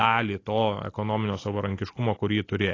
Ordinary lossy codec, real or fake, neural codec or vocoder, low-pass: AAC, 32 kbps; real; none; 7.2 kHz